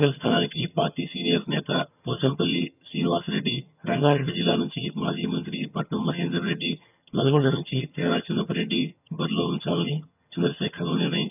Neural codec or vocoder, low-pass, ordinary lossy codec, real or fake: vocoder, 22.05 kHz, 80 mel bands, HiFi-GAN; 3.6 kHz; none; fake